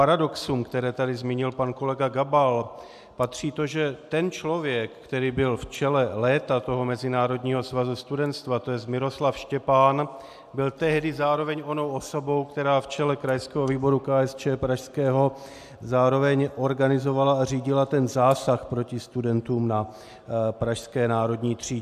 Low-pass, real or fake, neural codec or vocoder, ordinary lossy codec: 14.4 kHz; real; none; AAC, 96 kbps